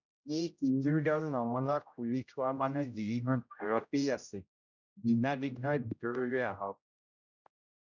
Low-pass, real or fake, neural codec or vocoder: 7.2 kHz; fake; codec, 16 kHz, 0.5 kbps, X-Codec, HuBERT features, trained on general audio